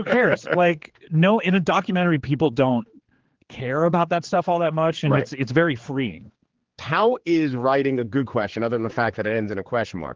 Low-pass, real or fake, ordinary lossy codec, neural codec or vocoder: 7.2 kHz; fake; Opus, 16 kbps; codec, 16 kHz, 4 kbps, X-Codec, HuBERT features, trained on general audio